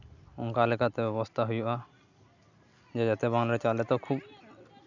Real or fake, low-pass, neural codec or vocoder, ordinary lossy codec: real; 7.2 kHz; none; none